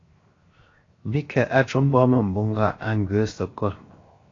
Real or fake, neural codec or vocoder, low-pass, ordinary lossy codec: fake; codec, 16 kHz, 0.7 kbps, FocalCodec; 7.2 kHz; AAC, 32 kbps